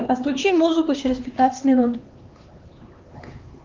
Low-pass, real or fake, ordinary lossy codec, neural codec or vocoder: 7.2 kHz; fake; Opus, 24 kbps; codec, 16 kHz, 2 kbps, X-Codec, HuBERT features, trained on LibriSpeech